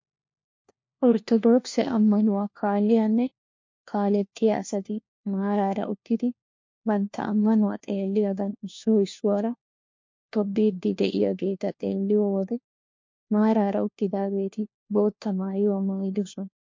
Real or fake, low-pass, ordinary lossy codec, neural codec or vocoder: fake; 7.2 kHz; MP3, 48 kbps; codec, 16 kHz, 1 kbps, FunCodec, trained on LibriTTS, 50 frames a second